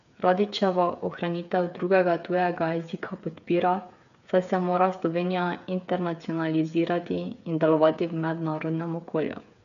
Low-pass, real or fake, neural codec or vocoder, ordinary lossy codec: 7.2 kHz; fake; codec, 16 kHz, 8 kbps, FreqCodec, smaller model; none